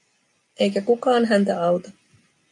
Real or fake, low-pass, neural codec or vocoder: real; 10.8 kHz; none